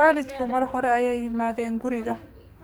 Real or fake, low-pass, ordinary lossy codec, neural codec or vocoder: fake; none; none; codec, 44.1 kHz, 2.6 kbps, SNAC